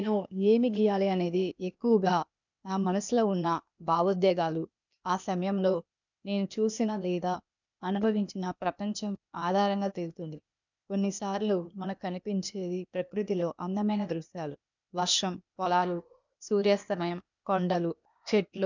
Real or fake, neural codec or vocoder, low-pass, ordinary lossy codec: fake; codec, 16 kHz, 0.8 kbps, ZipCodec; 7.2 kHz; none